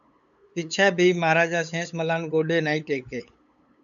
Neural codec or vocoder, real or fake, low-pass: codec, 16 kHz, 8 kbps, FunCodec, trained on LibriTTS, 25 frames a second; fake; 7.2 kHz